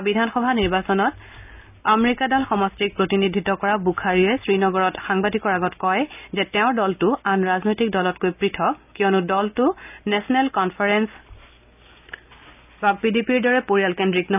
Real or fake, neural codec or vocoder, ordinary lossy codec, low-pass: real; none; none; 3.6 kHz